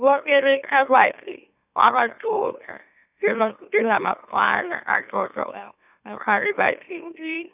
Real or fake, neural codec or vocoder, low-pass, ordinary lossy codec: fake; autoencoder, 44.1 kHz, a latent of 192 numbers a frame, MeloTTS; 3.6 kHz; none